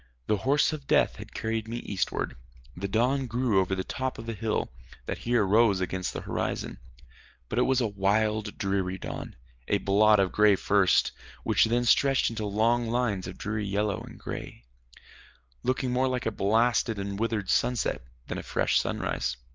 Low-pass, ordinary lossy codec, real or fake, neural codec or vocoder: 7.2 kHz; Opus, 24 kbps; real; none